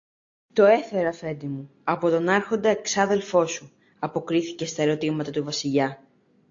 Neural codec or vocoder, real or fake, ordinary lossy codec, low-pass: none; real; AAC, 48 kbps; 7.2 kHz